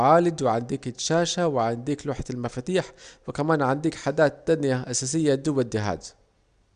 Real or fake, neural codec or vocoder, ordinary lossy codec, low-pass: real; none; Opus, 64 kbps; 9.9 kHz